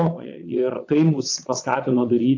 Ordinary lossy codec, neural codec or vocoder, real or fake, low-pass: AAC, 48 kbps; vocoder, 22.05 kHz, 80 mel bands, Vocos; fake; 7.2 kHz